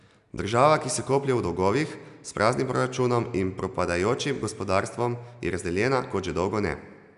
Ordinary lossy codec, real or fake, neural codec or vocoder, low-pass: none; real; none; 10.8 kHz